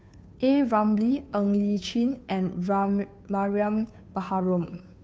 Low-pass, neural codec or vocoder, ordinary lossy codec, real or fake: none; codec, 16 kHz, 2 kbps, FunCodec, trained on Chinese and English, 25 frames a second; none; fake